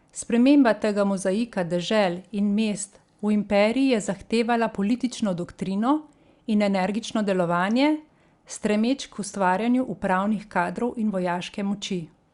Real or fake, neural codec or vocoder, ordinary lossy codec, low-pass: real; none; Opus, 64 kbps; 10.8 kHz